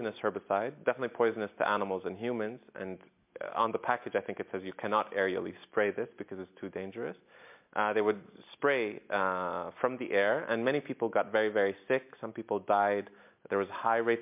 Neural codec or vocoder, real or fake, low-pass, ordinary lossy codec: none; real; 3.6 kHz; MP3, 32 kbps